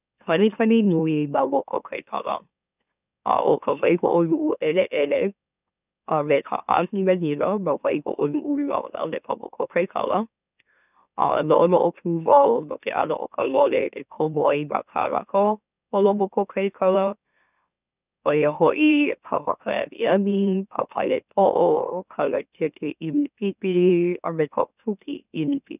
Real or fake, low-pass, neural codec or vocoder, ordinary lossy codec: fake; 3.6 kHz; autoencoder, 44.1 kHz, a latent of 192 numbers a frame, MeloTTS; none